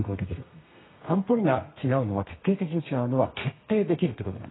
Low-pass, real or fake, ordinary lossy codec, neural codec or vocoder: 7.2 kHz; fake; AAC, 16 kbps; codec, 32 kHz, 1.9 kbps, SNAC